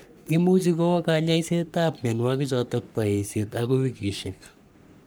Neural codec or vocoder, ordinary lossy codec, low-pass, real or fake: codec, 44.1 kHz, 3.4 kbps, Pupu-Codec; none; none; fake